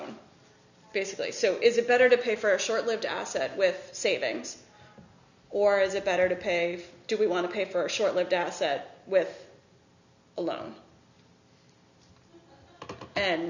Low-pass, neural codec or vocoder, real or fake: 7.2 kHz; none; real